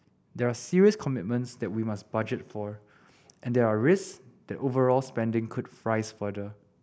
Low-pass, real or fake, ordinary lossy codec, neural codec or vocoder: none; real; none; none